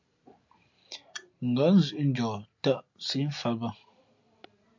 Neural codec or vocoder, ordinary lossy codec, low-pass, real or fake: none; MP3, 48 kbps; 7.2 kHz; real